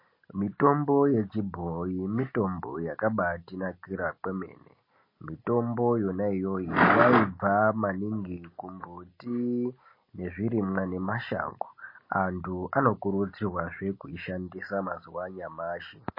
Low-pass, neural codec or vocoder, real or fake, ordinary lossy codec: 5.4 kHz; none; real; MP3, 24 kbps